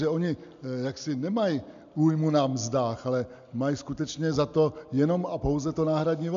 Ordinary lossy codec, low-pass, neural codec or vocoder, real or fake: MP3, 64 kbps; 7.2 kHz; none; real